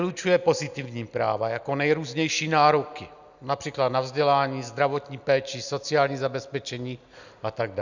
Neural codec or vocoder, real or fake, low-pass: none; real; 7.2 kHz